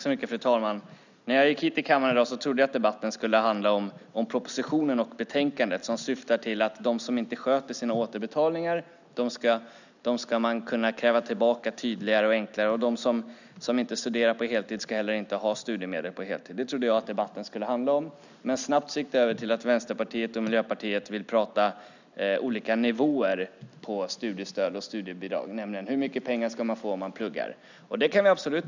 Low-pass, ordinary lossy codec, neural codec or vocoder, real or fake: 7.2 kHz; none; none; real